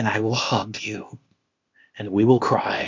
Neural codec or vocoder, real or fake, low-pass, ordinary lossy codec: codec, 16 kHz, 0.8 kbps, ZipCodec; fake; 7.2 kHz; MP3, 48 kbps